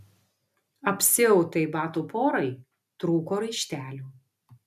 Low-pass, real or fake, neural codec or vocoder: 14.4 kHz; real; none